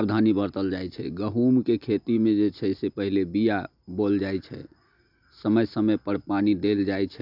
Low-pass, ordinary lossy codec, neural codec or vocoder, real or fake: 5.4 kHz; none; none; real